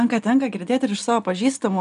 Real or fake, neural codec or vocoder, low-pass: real; none; 10.8 kHz